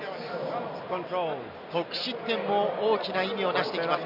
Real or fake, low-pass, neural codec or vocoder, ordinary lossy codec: real; 5.4 kHz; none; none